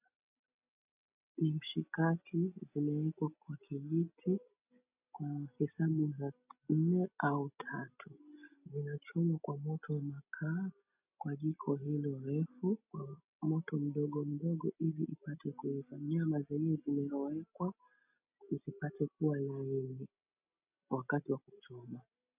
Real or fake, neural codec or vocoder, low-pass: real; none; 3.6 kHz